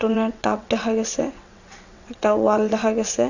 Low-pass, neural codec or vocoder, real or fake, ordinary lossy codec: 7.2 kHz; vocoder, 22.05 kHz, 80 mel bands, WaveNeXt; fake; none